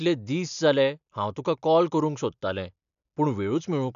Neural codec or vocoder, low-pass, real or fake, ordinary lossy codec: none; 7.2 kHz; real; none